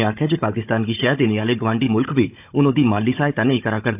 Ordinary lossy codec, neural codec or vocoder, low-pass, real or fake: AAC, 32 kbps; codec, 16 kHz, 16 kbps, FunCodec, trained on Chinese and English, 50 frames a second; 3.6 kHz; fake